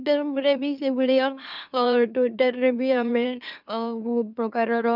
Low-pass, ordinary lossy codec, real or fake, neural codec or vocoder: 5.4 kHz; none; fake; autoencoder, 44.1 kHz, a latent of 192 numbers a frame, MeloTTS